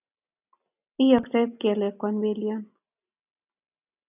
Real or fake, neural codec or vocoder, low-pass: real; none; 3.6 kHz